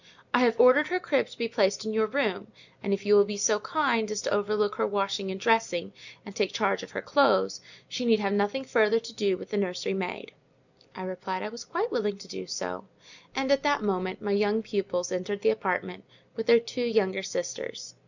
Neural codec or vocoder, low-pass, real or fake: none; 7.2 kHz; real